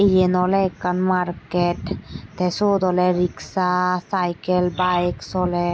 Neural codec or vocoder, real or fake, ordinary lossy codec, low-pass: none; real; none; none